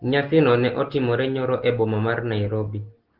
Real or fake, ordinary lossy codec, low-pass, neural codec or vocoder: real; Opus, 16 kbps; 5.4 kHz; none